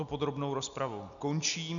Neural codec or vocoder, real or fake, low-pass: none; real; 7.2 kHz